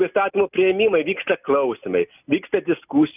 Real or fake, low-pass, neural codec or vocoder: real; 3.6 kHz; none